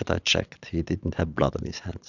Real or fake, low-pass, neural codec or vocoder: fake; 7.2 kHz; vocoder, 22.05 kHz, 80 mel bands, Vocos